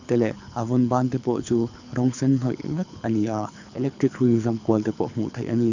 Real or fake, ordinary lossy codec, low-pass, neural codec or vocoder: fake; none; 7.2 kHz; codec, 24 kHz, 6 kbps, HILCodec